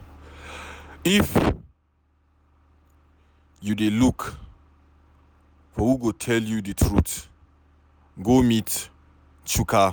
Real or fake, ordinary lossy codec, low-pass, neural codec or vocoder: real; none; none; none